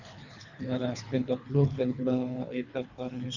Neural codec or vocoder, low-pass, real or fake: codec, 24 kHz, 3 kbps, HILCodec; 7.2 kHz; fake